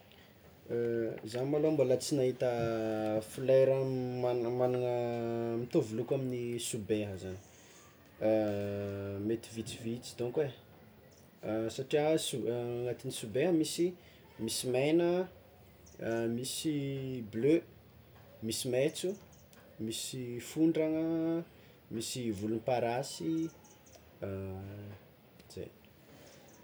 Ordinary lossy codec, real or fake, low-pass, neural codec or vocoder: none; real; none; none